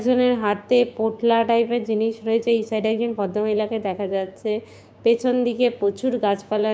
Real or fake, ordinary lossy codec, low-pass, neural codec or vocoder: fake; none; none; codec, 16 kHz, 6 kbps, DAC